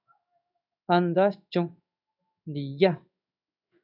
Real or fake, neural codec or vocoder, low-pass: fake; codec, 16 kHz in and 24 kHz out, 1 kbps, XY-Tokenizer; 5.4 kHz